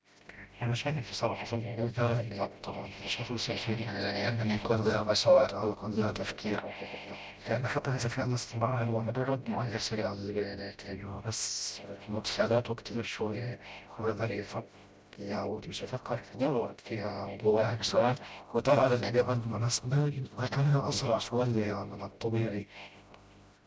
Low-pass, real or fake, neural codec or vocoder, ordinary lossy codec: none; fake; codec, 16 kHz, 0.5 kbps, FreqCodec, smaller model; none